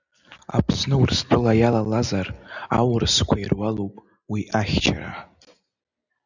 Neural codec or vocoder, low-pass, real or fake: none; 7.2 kHz; real